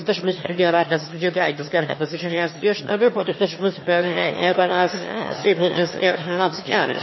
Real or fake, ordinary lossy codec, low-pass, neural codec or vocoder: fake; MP3, 24 kbps; 7.2 kHz; autoencoder, 22.05 kHz, a latent of 192 numbers a frame, VITS, trained on one speaker